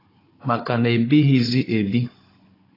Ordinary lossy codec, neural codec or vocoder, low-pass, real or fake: AAC, 24 kbps; codec, 16 kHz, 16 kbps, FunCodec, trained on Chinese and English, 50 frames a second; 5.4 kHz; fake